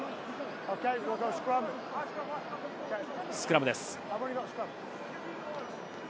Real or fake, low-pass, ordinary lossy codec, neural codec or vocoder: real; none; none; none